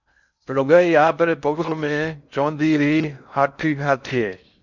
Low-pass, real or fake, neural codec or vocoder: 7.2 kHz; fake; codec, 16 kHz in and 24 kHz out, 0.6 kbps, FocalCodec, streaming, 4096 codes